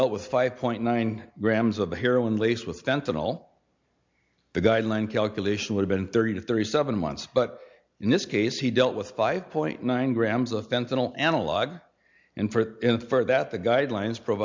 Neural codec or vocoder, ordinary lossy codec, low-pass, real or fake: none; MP3, 64 kbps; 7.2 kHz; real